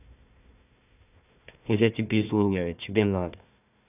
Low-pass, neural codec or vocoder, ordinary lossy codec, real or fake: 3.6 kHz; codec, 16 kHz, 1 kbps, FunCodec, trained on Chinese and English, 50 frames a second; none; fake